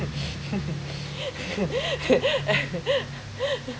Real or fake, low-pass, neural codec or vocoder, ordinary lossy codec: real; none; none; none